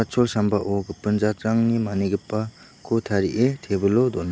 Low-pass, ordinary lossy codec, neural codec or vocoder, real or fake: none; none; none; real